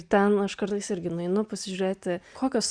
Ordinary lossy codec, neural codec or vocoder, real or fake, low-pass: Opus, 64 kbps; none; real; 9.9 kHz